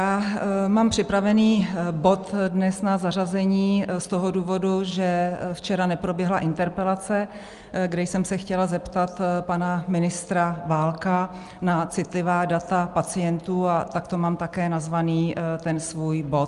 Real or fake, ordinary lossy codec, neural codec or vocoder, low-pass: real; Opus, 32 kbps; none; 10.8 kHz